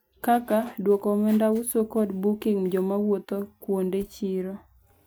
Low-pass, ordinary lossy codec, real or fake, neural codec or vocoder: none; none; real; none